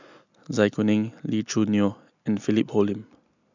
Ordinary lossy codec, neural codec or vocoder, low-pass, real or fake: none; none; 7.2 kHz; real